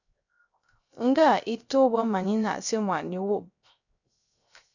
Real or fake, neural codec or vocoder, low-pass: fake; codec, 16 kHz, 0.3 kbps, FocalCodec; 7.2 kHz